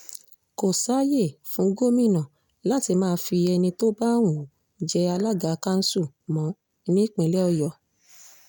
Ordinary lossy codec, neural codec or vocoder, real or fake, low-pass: none; none; real; none